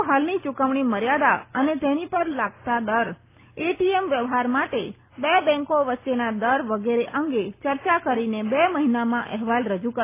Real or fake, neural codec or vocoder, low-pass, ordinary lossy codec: real; none; 3.6 kHz; AAC, 24 kbps